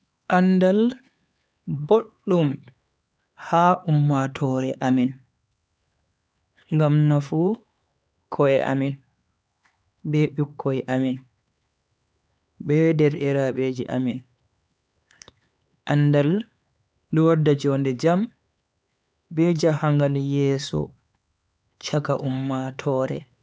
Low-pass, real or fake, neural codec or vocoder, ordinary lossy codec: none; fake; codec, 16 kHz, 4 kbps, X-Codec, HuBERT features, trained on LibriSpeech; none